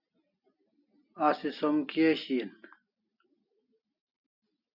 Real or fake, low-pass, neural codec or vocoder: real; 5.4 kHz; none